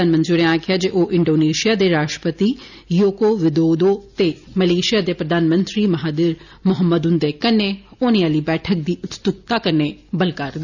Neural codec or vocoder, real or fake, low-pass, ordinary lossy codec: none; real; 7.2 kHz; none